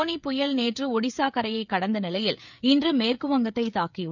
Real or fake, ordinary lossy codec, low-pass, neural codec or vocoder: fake; none; 7.2 kHz; codec, 16 kHz, 16 kbps, FreqCodec, smaller model